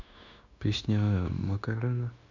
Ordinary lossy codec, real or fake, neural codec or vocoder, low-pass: none; fake; codec, 16 kHz, 0.9 kbps, LongCat-Audio-Codec; 7.2 kHz